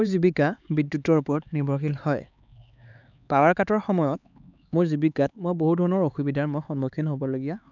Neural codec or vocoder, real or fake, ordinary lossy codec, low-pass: codec, 16 kHz, 4 kbps, X-Codec, HuBERT features, trained on LibriSpeech; fake; none; 7.2 kHz